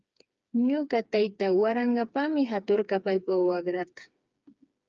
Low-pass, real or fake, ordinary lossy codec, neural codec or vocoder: 7.2 kHz; fake; Opus, 24 kbps; codec, 16 kHz, 4 kbps, FreqCodec, smaller model